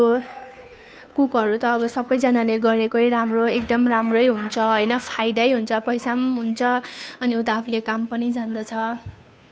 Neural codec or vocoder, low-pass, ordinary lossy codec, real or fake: codec, 16 kHz, 2 kbps, FunCodec, trained on Chinese and English, 25 frames a second; none; none; fake